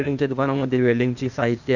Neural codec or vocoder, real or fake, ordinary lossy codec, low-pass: codec, 16 kHz, 0.8 kbps, ZipCodec; fake; none; 7.2 kHz